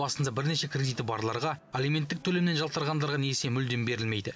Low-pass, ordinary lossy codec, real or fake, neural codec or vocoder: none; none; real; none